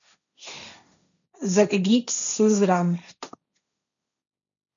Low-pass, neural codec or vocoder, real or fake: 7.2 kHz; codec, 16 kHz, 1.1 kbps, Voila-Tokenizer; fake